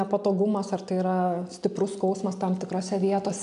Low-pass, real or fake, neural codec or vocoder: 10.8 kHz; real; none